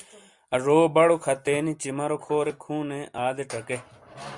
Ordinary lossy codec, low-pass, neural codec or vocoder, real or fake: Opus, 64 kbps; 10.8 kHz; none; real